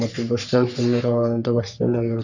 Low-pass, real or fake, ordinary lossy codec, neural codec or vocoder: 7.2 kHz; fake; none; codec, 44.1 kHz, 3.4 kbps, Pupu-Codec